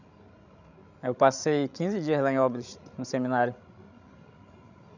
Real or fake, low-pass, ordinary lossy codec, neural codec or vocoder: fake; 7.2 kHz; none; codec, 16 kHz, 16 kbps, FreqCodec, larger model